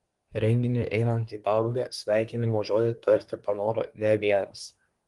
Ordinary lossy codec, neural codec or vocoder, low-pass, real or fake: Opus, 24 kbps; codec, 24 kHz, 1 kbps, SNAC; 10.8 kHz; fake